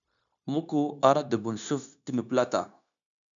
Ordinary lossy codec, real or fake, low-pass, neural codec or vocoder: MP3, 96 kbps; fake; 7.2 kHz; codec, 16 kHz, 0.9 kbps, LongCat-Audio-Codec